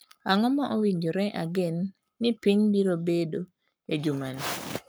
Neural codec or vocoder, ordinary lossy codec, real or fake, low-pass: codec, 44.1 kHz, 7.8 kbps, Pupu-Codec; none; fake; none